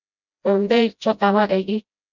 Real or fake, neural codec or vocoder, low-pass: fake; codec, 16 kHz, 0.5 kbps, FreqCodec, smaller model; 7.2 kHz